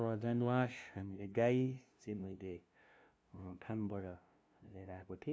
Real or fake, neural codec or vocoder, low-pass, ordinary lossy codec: fake; codec, 16 kHz, 0.5 kbps, FunCodec, trained on LibriTTS, 25 frames a second; none; none